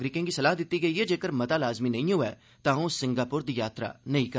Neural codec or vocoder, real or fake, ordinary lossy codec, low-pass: none; real; none; none